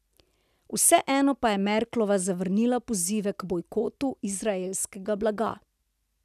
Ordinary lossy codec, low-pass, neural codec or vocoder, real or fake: none; 14.4 kHz; none; real